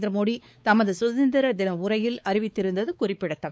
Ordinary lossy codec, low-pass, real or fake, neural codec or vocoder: none; none; fake; codec, 16 kHz, 4 kbps, X-Codec, WavLM features, trained on Multilingual LibriSpeech